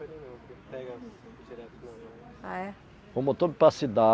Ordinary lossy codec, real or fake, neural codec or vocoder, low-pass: none; real; none; none